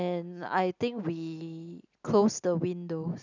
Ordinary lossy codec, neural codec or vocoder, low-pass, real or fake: none; none; 7.2 kHz; real